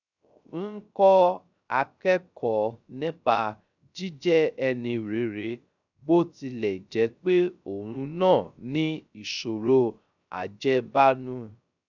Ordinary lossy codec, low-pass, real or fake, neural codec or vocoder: none; 7.2 kHz; fake; codec, 16 kHz, 0.3 kbps, FocalCodec